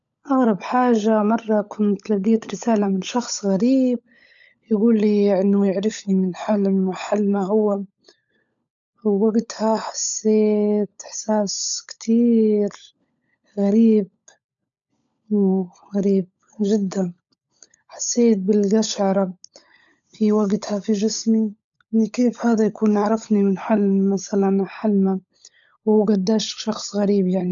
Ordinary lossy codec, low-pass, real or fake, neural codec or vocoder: none; 7.2 kHz; fake; codec, 16 kHz, 16 kbps, FunCodec, trained on LibriTTS, 50 frames a second